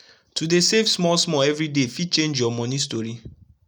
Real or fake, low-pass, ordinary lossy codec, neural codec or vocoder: real; none; none; none